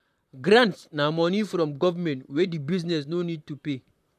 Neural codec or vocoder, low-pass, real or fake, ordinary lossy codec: vocoder, 44.1 kHz, 128 mel bands, Pupu-Vocoder; 14.4 kHz; fake; none